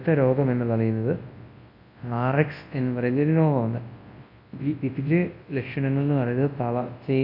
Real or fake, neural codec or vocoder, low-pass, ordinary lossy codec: fake; codec, 24 kHz, 0.9 kbps, WavTokenizer, large speech release; 5.4 kHz; MP3, 32 kbps